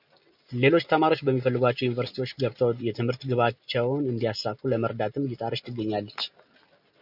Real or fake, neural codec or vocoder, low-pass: real; none; 5.4 kHz